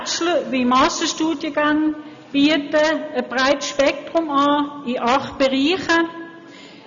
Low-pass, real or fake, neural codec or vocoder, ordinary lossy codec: 7.2 kHz; real; none; none